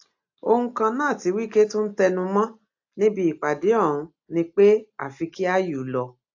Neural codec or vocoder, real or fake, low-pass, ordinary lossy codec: none; real; 7.2 kHz; AAC, 48 kbps